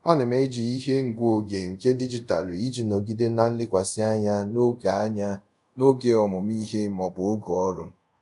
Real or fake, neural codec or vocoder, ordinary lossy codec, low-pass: fake; codec, 24 kHz, 0.5 kbps, DualCodec; none; 10.8 kHz